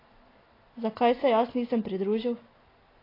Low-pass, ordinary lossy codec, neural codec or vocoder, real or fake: 5.4 kHz; AAC, 24 kbps; none; real